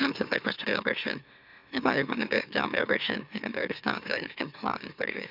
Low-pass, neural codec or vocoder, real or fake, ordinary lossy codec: 5.4 kHz; autoencoder, 44.1 kHz, a latent of 192 numbers a frame, MeloTTS; fake; none